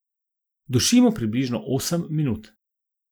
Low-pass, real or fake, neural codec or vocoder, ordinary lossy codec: none; real; none; none